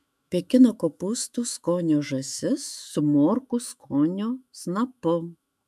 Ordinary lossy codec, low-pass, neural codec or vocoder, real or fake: MP3, 96 kbps; 14.4 kHz; autoencoder, 48 kHz, 128 numbers a frame, DAC-VAE, trained on Japanese speech; fake